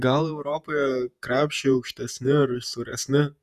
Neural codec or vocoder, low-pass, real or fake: vocoder, 48 kHz, 128 mel bands, Vocos; 14.4 kHz; fake